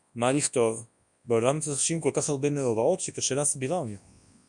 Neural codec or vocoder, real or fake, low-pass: codec, 24 kHz, 0.9 kbps, WavTokenizer, large speech release; fake; 10.8 kHz